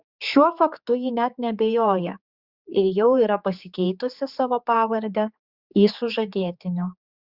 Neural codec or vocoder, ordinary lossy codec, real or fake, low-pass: codec, 16 kHz, 4 kbps, X-Codec, HuBERT features, trained on general audio; Opus, 64 kbps; fake; 5.4 kHz